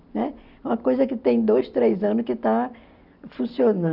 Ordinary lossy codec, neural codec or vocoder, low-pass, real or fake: none; none; 5.4 kHz; real